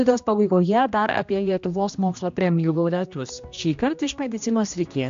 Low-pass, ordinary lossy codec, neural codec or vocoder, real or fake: 7.2 kHz; AAC, 64 kbps; codec, 16 kHz, 1 kbps, X-Codec, HuBERT features, trained on general audio; fake